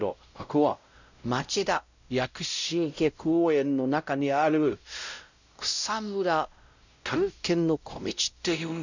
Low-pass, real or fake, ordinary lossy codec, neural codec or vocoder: 7.2 kHz; fake; none; codec, 16 kHz, 0.5 kbps, X-Codec, WavLM features, trained on Multilingual LibriSpeech